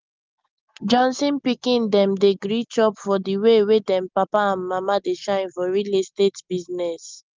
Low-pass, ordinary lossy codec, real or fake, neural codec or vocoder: 7.2 kHz; Opus, 32 kbps; real; none